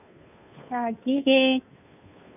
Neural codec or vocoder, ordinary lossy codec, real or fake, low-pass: codec, 16 kHz, 2 kbps, FunCodec, trained on Chinese and English, 25 frames a second; none; fake; 3.6 kHz